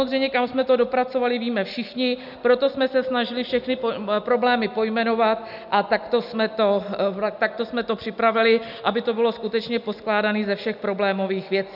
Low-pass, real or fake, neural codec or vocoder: 5.4 kHz; real; none